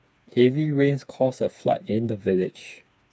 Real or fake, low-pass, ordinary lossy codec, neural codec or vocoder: fake; none; none; codec, 16 kHz, 4 kbps, FreqCodec, smaller model